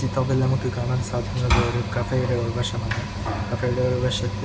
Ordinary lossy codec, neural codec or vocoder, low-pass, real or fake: none; none; none; real